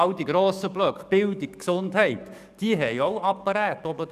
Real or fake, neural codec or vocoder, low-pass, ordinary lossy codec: fake; codec, 44.1 kHz, 7.8 kbps, DAC; 14.4 kHz; none